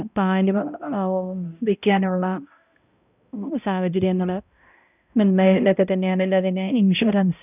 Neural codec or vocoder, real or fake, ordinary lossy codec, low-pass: codec, 16 kHz, 0.5 kbps, X-Codec, HuBERT features, trained on balanced general audio; fake; none; 3.6 kHz